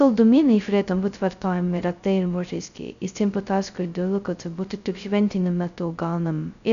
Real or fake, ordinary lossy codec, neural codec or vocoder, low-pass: fake; MP3, 96 kbps; codec, 16 kHz, 0.2 kbps, FocalCodec; 7.2 kHz